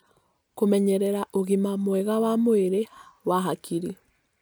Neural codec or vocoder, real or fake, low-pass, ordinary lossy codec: none; real; none; none